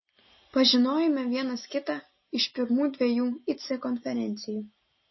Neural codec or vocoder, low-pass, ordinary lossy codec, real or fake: none; 7.2 kHz; MP3, 24 kbps; real